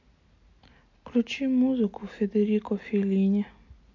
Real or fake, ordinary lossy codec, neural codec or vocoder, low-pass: real; MP3, 48 kbps; none; 7.2 kHz